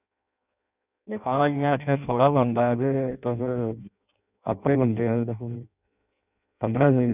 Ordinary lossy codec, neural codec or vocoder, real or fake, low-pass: none; codec, 16 kHz in and 24 kHz out, 0.6 kbps, FireRedTTS-2 codec; fake; 3.6 kHz